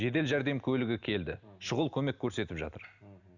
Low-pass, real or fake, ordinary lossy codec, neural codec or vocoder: 7.2 kHz; real; none; none